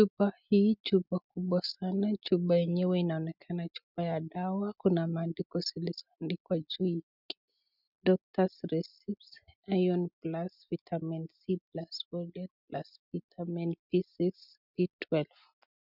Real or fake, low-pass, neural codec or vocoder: real; 5.4 kHz; none